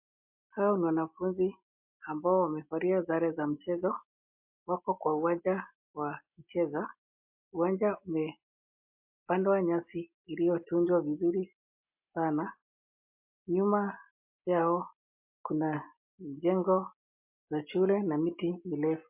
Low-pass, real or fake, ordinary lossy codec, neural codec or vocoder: 3.6 kHz; real; MP3, 32 kbps; none